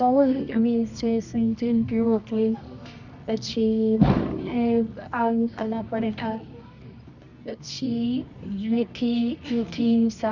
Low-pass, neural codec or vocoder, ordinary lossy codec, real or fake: 7.2 kHz; codec, 24 kHz, 0.9 kbps, WavTokenizer, medium music audio release; none; fake